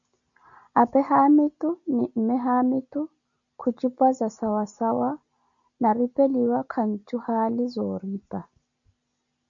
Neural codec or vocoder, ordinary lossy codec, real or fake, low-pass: none; MP3, 96 kbps; real; 7.2 kHz